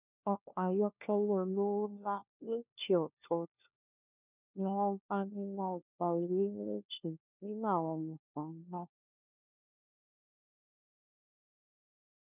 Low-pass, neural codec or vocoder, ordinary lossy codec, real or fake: 3.6 kHz; codec, 16 kHz, 1 kbps, FunCodec, trained on LibriTTS, 50 frames a second; none; fake